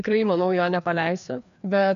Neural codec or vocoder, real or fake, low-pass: codec, 16 kHz, 2 kbps, FreqCodec, larger model; fake; 7.2 kHz